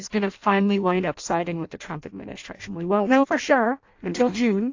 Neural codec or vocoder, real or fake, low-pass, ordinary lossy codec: codec, 16 kHz in and 24 kHz out, 0.6 kbps, FireRedTTS-2 codec; fake; 7.2 kHz; AAC, 48 kbps